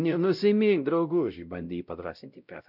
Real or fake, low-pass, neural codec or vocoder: fake; 5.4 kHz; codec, 16 kHz, 0.5 kbps, X-Codec, WavLM features, trained on Multilingual LibriSpeech